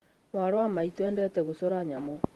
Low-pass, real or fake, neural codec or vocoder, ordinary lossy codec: 14.4 kHz; fake; vocoder, 48 kHz, 128 mel bands, Vocos; Opus, 24 kbps